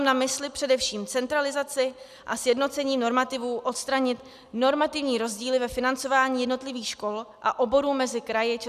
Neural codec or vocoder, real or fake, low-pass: none; real; 14.4 kHz